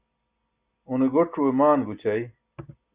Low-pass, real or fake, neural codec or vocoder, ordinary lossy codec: 3.6 kHz; real; none; Opus, 64 kbps